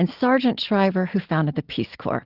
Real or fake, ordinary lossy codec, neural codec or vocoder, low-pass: real; Opus, 16 kbps; none; 5.4 kHz